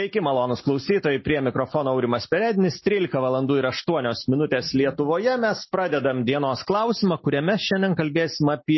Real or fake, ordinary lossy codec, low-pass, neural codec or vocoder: real; MP3, 24 kbps; 7.2 kHz; none